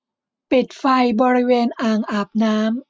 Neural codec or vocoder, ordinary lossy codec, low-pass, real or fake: none; none; none; real